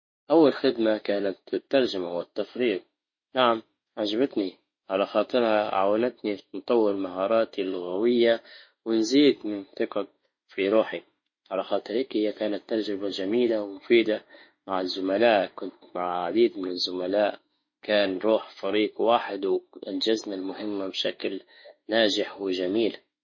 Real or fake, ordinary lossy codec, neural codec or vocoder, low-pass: fake; MP3, 32 kbps; autoencoder, 48 kHz, 32 numbers a frame, DAC-VAE, trained on Japanese speech; 7.2 kHz